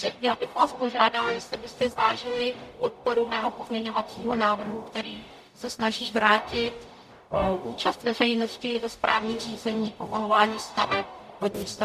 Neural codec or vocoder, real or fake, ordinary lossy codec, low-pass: codec, 44.1 kHz, 0.9 kbps, DAC; fake; MP3, 96 kbps; 14.4 kHz